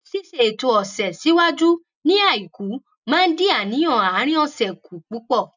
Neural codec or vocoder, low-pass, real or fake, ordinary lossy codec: none; 7.2 kHz; real; none